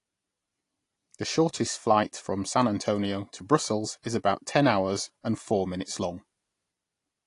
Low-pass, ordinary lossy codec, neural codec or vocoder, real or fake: 10.8 kHz; AAC, 48 kbps; none; real